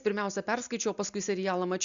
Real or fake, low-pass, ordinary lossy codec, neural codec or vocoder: real; 7.2 kHz; MP3, 96 kbps; none